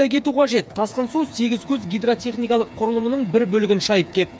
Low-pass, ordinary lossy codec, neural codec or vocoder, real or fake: none; none; codec, 16 kHz, 4 kbps, FreqCodec, smaller model; fake